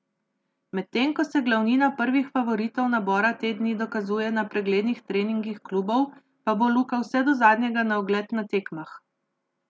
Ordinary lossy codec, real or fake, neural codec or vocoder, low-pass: none; real; none; none